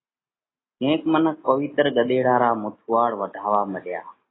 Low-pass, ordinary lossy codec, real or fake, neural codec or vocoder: 7.2 kHz; AAC, 16 kbps; real; none